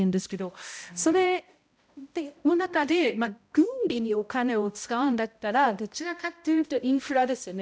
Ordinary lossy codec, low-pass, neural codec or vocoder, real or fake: none; none; codec, 16 kHz, 0.5 kbps, X-Codec, HuBERT features, trained on balanced general audio; fake